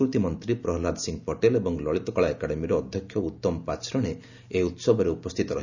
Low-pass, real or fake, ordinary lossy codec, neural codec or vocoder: 7.2 kHz; real; none; none